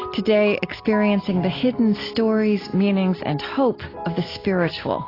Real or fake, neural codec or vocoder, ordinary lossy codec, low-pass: real; none; AAC, 24 kbps; 5.4 kHz